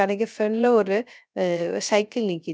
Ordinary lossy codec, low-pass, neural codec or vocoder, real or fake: none; none; codec, 16 kHz, 0.3 kbps, FocalCodec; fake